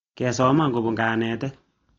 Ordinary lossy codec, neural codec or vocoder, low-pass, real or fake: AAC, 32 kbps; none; 7.2 kHz; real